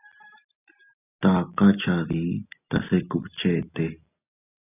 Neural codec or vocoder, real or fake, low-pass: none; real; 3.6 kHz